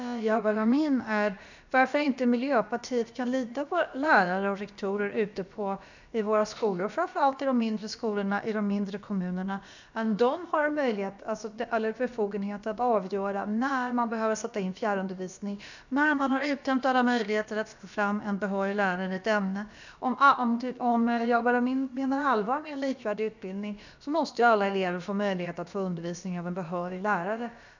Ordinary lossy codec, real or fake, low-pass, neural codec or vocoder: none; fake; 7.2 kHz; codec, 16 kHz, about 1 kbps, DyCAST, with the encoder's durations